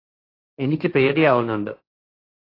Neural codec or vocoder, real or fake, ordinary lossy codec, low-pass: codec, 16 kHz, 1.1 kbps, Voila-Tokenizer; fake; AAC, 32 kbps; 5.4 kHz